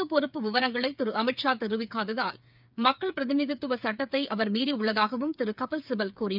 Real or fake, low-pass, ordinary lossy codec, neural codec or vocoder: fake; 5.4 kHz; none; codec, 16 kHz, 8 kbps, FreqCodec, smaller model